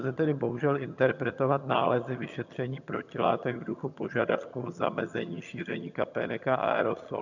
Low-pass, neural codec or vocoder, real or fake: 7.2 kHz; vocoder, 22.05 kHz, 80 mel bands, HiFi-GAN; fake